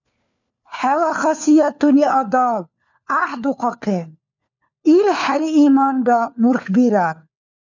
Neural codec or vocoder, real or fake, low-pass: codec, 16 kHz, 4 kbps, FunCodec, trained on LibriTTS, 50 frames a second; fake; 7.2 kHz